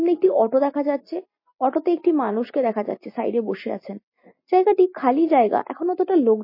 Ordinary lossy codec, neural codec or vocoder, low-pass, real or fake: MP3, 24 kbps; none; 5.4 kHz; real